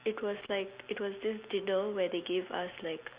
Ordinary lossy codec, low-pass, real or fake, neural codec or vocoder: Opus, 64 kbps; 3.6 kHz; real; none